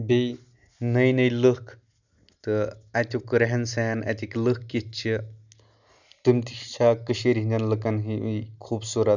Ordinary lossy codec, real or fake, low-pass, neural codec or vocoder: none; real; 7.2 kHz; none